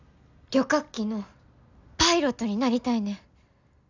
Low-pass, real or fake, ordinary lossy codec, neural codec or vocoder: 7.2 kHz; real; none; none